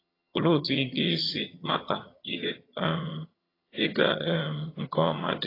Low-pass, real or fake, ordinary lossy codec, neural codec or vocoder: 5.4 kHz; fake; AAC, 24 kbps; vocoder, 22.05 kHz, 80 mel bands, HiFi-GAN